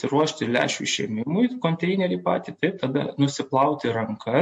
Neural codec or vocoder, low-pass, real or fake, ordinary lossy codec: none; 10.8 kHz; real; MP3, 48 kbps